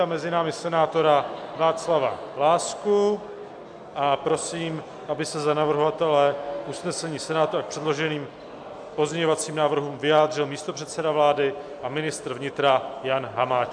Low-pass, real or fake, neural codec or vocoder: 9.9 kHz; real; none